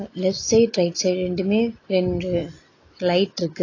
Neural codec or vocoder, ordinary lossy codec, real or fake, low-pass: none; AAC, 32 kbps; real; 7.2 kHz